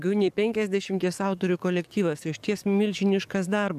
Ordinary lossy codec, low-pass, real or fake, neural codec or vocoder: AAC, 96 kbps; 14.4 kHz; fake; codec, 44.1 kHz, 7.8 kbps, DAC